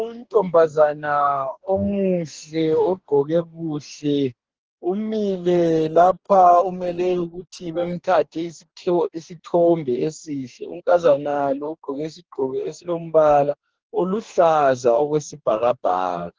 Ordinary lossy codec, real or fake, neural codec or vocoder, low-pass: Opus, 16 kbps; fake; codec, 44.1 kHz, 2.6 kbps, DAC; 7.2 kHz